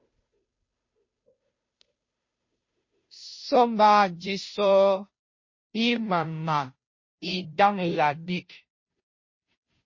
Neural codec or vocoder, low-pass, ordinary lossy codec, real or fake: codec, 16 kHz, 0.5 kbps, FunCodec, trained on Chinese and English, 25 frames a second; 7.2 kHz; MP3, 32 kbps; fake